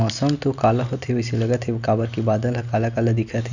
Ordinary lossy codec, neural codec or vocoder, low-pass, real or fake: none; none; 7.2 kHz; real